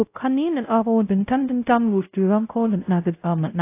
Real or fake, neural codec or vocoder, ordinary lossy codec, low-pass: fake; codec, 16 kHz, 0.5 kbps, X-Codec, WavLM features, trained on Multilingual LibriSpeech; AAC, 24 kbps; 3.6 kHz